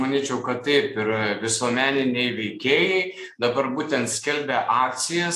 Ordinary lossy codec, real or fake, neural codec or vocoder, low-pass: AAC, 64 kbps; real; none; 14.4 kHz